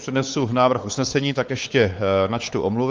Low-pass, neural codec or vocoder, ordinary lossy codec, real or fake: 7.2 kHz; codec, 16 kHz, 4 kbps, X-Codec, WavLM features, trained on Multilingual LibriSpeech; Opus, 32 kbps; fake